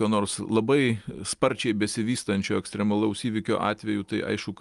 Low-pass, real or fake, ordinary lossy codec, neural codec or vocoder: 10.8 kHz; real; Opus, 32 kbps; none